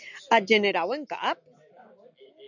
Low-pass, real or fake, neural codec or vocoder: 7.2 kHz; real; none